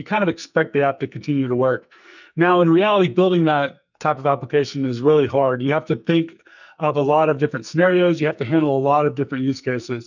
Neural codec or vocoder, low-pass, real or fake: codec, 44.1 kHz, 2.6 kbps, SNAC; 7.2 kHz; fake